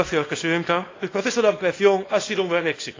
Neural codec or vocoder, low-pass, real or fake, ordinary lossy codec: codec, 24 kHz, 0.9 kbps, WavTokenizer, small release; 7.2 kHz; fake; AAC, 32 kbps